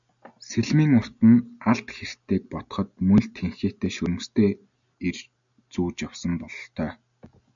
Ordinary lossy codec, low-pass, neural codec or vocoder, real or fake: MP3, 96 kbps; 7.2 kHz; none; real